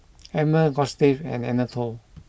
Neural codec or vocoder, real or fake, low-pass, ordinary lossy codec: none; real; none; none